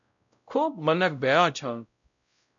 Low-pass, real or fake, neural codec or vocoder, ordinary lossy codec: 7.2 kHz; fake; codec, 16 kHz, 0.5 kbps, X-Codec, WavLM features, trained on Multilingual LibriSpeech; MP3, 96 kbps